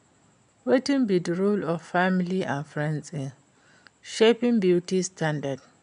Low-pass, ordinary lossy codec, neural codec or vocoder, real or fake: 9.9 kHz; none; none; real